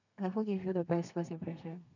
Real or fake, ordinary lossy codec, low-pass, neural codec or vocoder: fake; none; 7.2 kHz; codec, 32 kHz, 1.9 kbps, SNAC